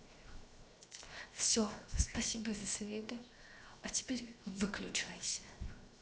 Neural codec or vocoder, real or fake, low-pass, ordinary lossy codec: codec, 16 kHz, 0.7 kbps, FocalCodec; fake; none; none